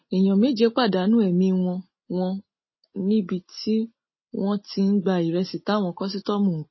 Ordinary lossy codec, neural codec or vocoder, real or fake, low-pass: MP3, 24 kbps; none; real; 7.2 kHz